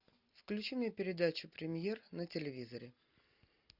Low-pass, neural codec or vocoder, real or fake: 5.4 kHz; none; real